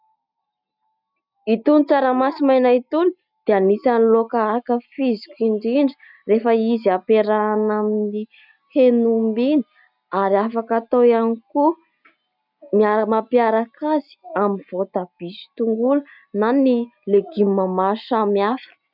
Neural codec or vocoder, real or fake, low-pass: none; real; 5.4 kHz